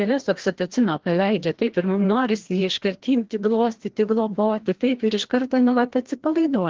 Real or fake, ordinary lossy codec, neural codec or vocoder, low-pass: fake; Opus, 16 kbps; codec, 16 kHz, 1 kbps, FreqCodec, larger model; 7.2 kHz